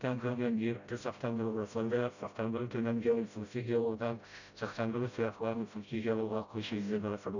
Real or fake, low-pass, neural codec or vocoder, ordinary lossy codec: fake; 7.2 kHz; codec, 16 kHz, 0.5 kbps, FreqCodec, smaller model; none